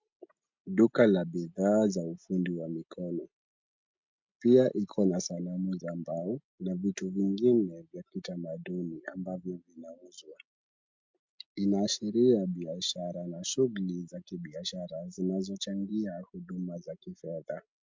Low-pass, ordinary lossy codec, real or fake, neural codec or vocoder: 7.2 kHz; MP3, 64 kbps; real; none